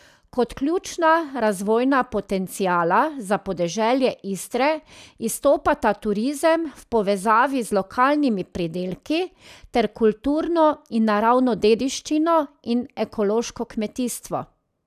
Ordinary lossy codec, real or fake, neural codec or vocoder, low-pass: none; real; none; 14.4 kHz